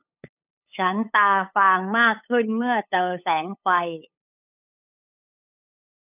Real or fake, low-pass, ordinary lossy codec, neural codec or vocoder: fake; 3.6 kHz; none; codec, 16 kHz, 8 kbps, FunCodec, trained on LibriTTS, 25 frames a second